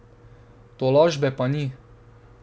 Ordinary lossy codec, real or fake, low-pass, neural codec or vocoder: none; real; none; none